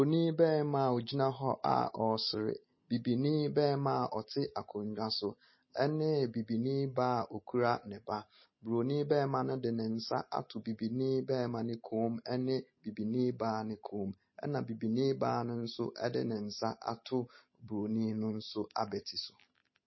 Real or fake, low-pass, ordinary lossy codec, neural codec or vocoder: real; 7.2 kHz; MP3, 24 kbps; none